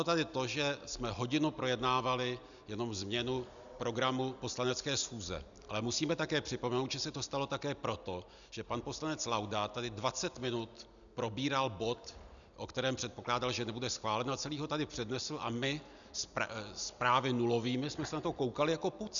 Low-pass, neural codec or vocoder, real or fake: 7.2 kHz; none; real